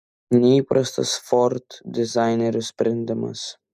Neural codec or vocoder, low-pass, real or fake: none; 14.4 kHz; real